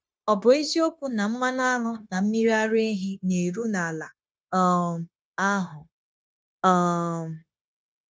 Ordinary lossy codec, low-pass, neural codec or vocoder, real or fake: none; none; codec, 16 kHz, 0.9 kbps, LongCat-Audio-Codec; fake